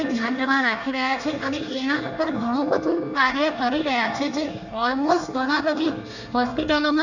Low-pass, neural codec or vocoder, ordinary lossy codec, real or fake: 7.2 kHz; codec, 24 kHz, 1 kbps, SNAC; none; fake